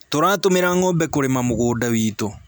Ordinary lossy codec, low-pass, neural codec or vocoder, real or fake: none; none; none; real